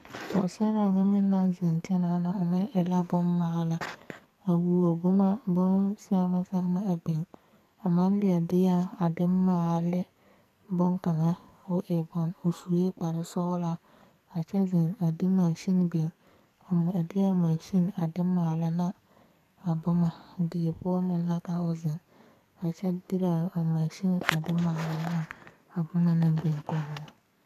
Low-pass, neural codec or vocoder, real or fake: 14.4 kHz; codec, 44.1 kHz, 2.6 kbps, SNAC; fake